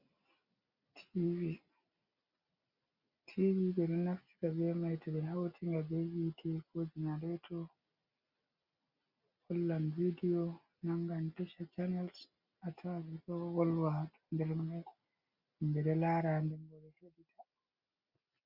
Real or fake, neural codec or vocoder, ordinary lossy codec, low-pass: real; none; Opus, 64 kbps; 5.4 kHz